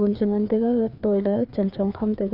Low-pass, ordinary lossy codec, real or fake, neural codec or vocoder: 5.4 kHz; none; fake; codec, 16 kHz, 2 kbps, FreqCodec, larger model